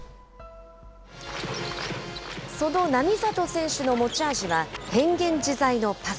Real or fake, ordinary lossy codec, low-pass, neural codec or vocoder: real; none; none; none